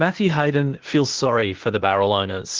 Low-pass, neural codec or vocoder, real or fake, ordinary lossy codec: 7.2 kHz; codec, 16 kHz, 0.8 kbps, ZipCodec; fake; Opus, 16 kbps